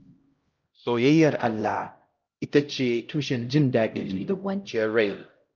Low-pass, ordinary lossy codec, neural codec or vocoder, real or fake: 7.2 kHz; Opus, 24 kbps; codec, 16 kHz, 0.5 kbps, X-Codec, HuBERT features, trained on LibriSpeech; fake